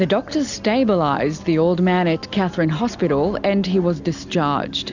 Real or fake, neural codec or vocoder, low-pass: real; none; 7.2 kHz